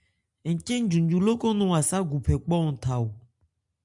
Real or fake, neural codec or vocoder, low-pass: real; none; 10.8 kHz